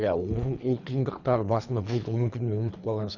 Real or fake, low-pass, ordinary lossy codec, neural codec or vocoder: fake; 7.2 kHz; none; codec, 24 kHz, 3 kbps, HILCodec